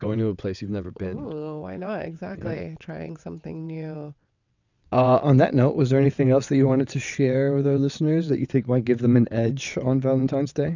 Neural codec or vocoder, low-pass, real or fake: vocoder, 22.05 kHz, 80 mel bands, WaveNeXt; 7.2 kHz; fake